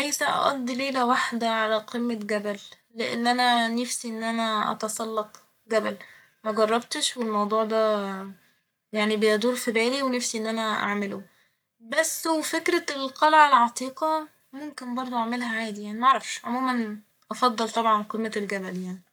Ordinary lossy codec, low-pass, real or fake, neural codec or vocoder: none; none; fake; codec, 44.1 kHz, 7.8 kbps, Pupu-Codec